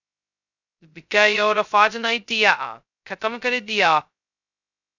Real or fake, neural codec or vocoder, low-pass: fake; codec, 16 kHz, 0.2 kbps, FocalCodec; 7.2 kHz